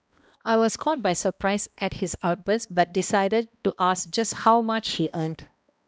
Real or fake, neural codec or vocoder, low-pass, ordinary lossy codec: fake; codec, 16 kHz, 1 kbps, X-Codec, HuBERT features, trained on balanced general audio; none; none